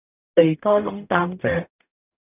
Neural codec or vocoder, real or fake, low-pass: codec, 44.1 kHz, 0.9 kbps, DAC; fake; 3.6 kHz